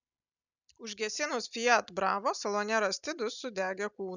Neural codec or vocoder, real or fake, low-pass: none; real; 7.2 kHz